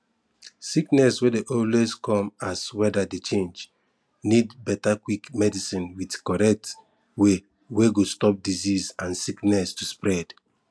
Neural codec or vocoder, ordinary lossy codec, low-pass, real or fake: none; none; none; real